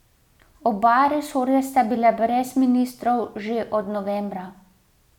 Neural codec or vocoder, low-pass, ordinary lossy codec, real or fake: none; 19.8 kHz; none; real